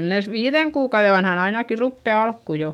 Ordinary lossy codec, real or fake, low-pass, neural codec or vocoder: none; fake; 19.8 kHz; codec, 44.1 kHz, 7.8 kbps, DAC